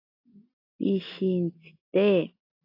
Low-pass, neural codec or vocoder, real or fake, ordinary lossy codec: 5.4 kHz; none; real; AAC, 32 kbps